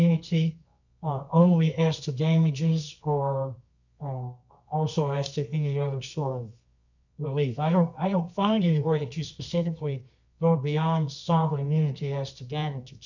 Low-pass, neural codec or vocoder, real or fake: 7.2 kHz; codec, 24 kHz, 0.9 kbps, WavTokenizer, medium music audio release; fake